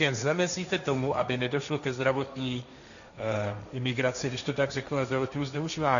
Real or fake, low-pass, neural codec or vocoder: fake; 7.2 kHz; codec, 16 kHz, 1.1 kbps, Voila-Tokenizer